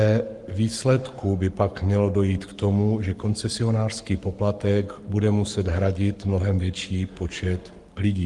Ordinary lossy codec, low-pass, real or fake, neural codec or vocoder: Opus, 24 kbps; 10.8 kHz; fake; codec, 44.1 kHz, 7.8 kbps, Pupu-Codec